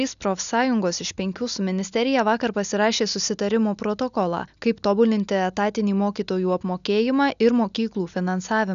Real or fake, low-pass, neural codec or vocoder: real; 7.2 kHz; none